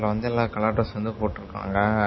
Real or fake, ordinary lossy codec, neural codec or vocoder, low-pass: real; MP3, 24 kbps; none; 7.2 kHz